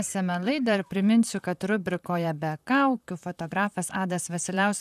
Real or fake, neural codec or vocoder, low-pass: fake; vocoder, 44.1 kHz, 128 mel bands, Pupu-Vocoder; 14.4 kHz